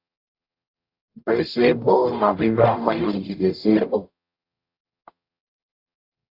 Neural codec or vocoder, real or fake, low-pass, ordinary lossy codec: codec, 44.1 kHz, 0.9 kbps, DAC; fake; 5.4 kHz; AAC, 32 kbps